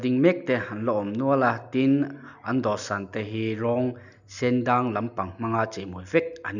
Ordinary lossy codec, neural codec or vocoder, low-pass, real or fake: none; none; 7.2 kHz; real